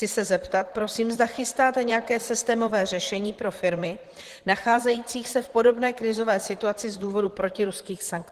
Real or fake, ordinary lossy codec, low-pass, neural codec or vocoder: fake; Opus, 16 kbps; 14.4 kHz; vocoder, 44.1 kHz, 128 mel bands, Pupu-Vocoder